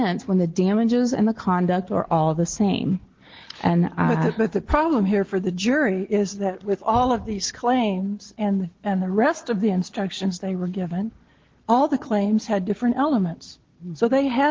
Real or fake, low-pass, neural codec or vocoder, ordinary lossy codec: real; 7.2 kHz; none; Opus, 16 kbps